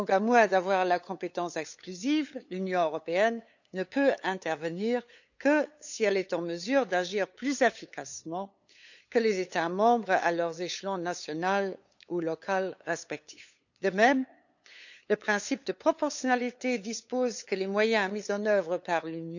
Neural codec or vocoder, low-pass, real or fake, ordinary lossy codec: codec, 16 kHz, 8 kbps, FunCodec, trained on LibriTTS, 25 frames a second; 7.2 kHz; fake; none